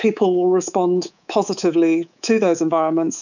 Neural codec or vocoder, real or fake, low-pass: codec, 24 kHz, 3.1 kbps, DualCodec; fake; 7.2 kHz